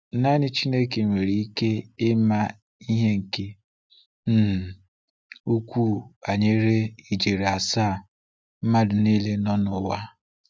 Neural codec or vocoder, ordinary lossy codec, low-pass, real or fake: none; none; none; real